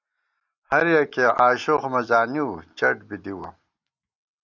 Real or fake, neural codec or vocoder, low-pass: real; none; 7.2 kHz